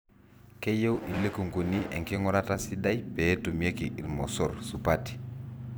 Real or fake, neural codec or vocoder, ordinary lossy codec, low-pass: fake; vocoder, 44.1 kHz, 128 mel bands every 256 samples, BigVGAN v2; none; none